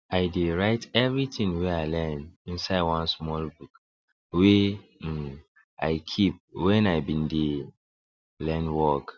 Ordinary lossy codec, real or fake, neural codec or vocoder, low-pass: none; real; none; none